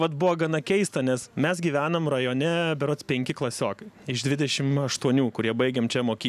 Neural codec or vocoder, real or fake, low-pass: none; real; 14.4 kHz